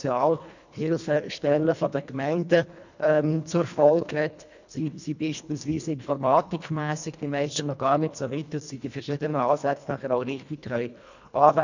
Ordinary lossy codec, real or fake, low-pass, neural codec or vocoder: none; fake; 7.2 kHz; codec, 24 kHz, 1.5 kbps, HILCodec